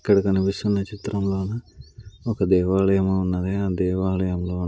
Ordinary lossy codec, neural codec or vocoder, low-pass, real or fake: none; none; none; real